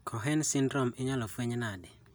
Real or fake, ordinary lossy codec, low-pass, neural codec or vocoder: real; none; none; none